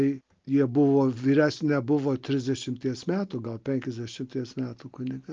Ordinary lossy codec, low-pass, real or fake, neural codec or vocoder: Opus, 24 kbps; 7.2 kHz; real; none